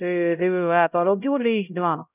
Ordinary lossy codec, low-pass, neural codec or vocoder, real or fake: none; 3.6 kHz; codec, 16 kHz, 0.5 kbps, X-Codec, HuBERT features, trained on LibriSpeech; fake